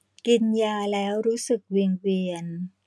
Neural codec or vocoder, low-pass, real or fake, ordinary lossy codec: none; none; real; none